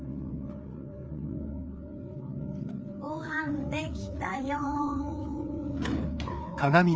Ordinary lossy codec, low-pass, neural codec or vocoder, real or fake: none; none; codec, 16 kHz, 4 kbps, FreqCodec, larger model; fake